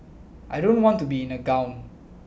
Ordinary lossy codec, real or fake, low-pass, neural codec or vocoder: none; real; none; none